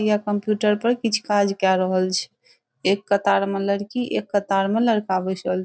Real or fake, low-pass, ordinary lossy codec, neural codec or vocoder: real; none; none; none